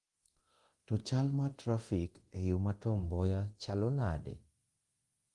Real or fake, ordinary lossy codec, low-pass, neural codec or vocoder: fake; Opus, 24 kbps; 10.8 kHz; codec, 24 kHz, 0.9 kbps, DualCodec